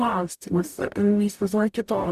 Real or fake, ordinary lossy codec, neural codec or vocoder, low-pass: fake; Opus, 64 kbps; codec, 44.1 kHz, 0.9 kbps, DAC; 14.4 kHz